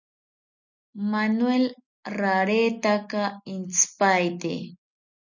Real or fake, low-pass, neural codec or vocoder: real; 7.2 kHz; none